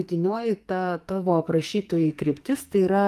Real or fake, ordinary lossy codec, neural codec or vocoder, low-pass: fake; Opus, 32 kbps; codec, 44.1 kHz, 2.6 kbps, SNAC; 14.4 kHz